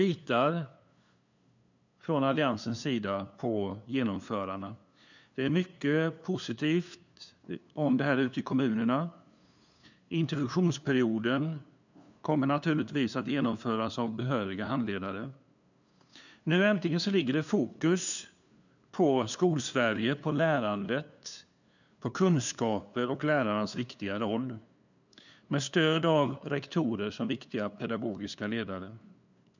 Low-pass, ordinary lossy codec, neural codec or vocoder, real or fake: 7.2 kHz; none; codec, 16 kHz, 2 kbps, FunCodec, trained on LibriTTS, 25 frames a second; fake